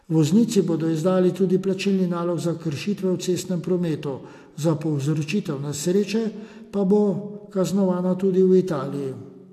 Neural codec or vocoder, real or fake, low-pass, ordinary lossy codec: none; real; 14.4 kHz; AAC, 64 kbps